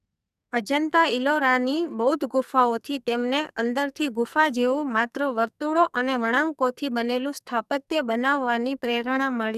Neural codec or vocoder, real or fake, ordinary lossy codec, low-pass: codec, 44.1 kHz, 2.6 kbps, SNAC; fake; none; 14.4 kHz